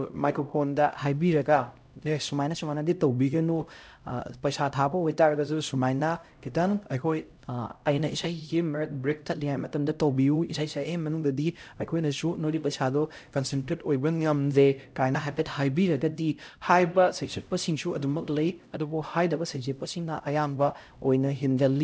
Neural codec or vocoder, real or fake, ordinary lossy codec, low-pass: codec, 16 kHz, 0.5 kbps, X-Codec, HuBERT features, trained on LibriSpeech; fake; none; none